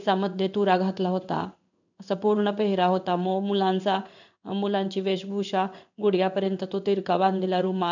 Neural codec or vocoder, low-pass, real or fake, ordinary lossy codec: codec, 16 kHz in and 24 kHz out, 1 kbps, XY-Tokenizer; 7.2 kHz; fake; none